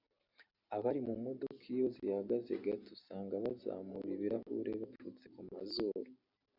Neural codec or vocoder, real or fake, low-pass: none; real; 5.4 kHz